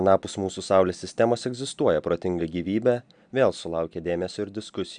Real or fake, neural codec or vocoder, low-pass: real; none; 9.9 kHz